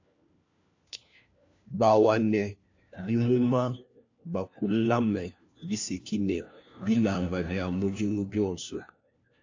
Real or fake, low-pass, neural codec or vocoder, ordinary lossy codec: fake; 7.2 kHz; codec, 16 kHz, 1 kbps, FunCodec, trained on LibriTTS, 50 frames a second; AAC, 48 kbps